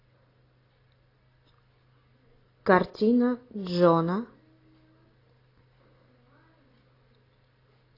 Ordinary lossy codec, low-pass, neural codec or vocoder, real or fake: AAC, 24 kbps; 5.4 kHz; none; real